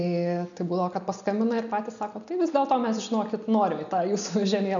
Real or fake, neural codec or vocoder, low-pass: real; none; 7.2 kHz